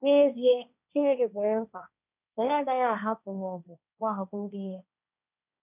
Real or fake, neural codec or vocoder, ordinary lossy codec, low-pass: fake; codec, 16 kHz, 1.1 kbps, Voila-Tokenizer; none; 3.6 kHz